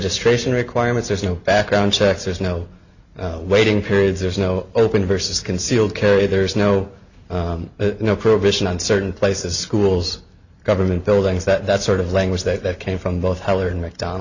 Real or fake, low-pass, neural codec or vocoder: real; 7.2 kHz; none